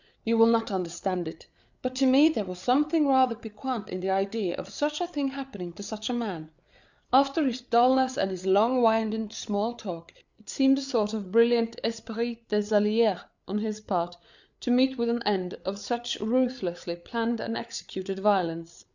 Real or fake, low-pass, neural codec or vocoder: fake; 7.2 kHz; codec, 16 kHz, 8 kbps, FreqCodec, larger model